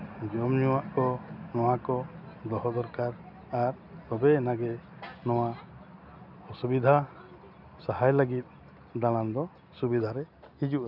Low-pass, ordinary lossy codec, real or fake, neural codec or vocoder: 5.4 kHz; none; real; none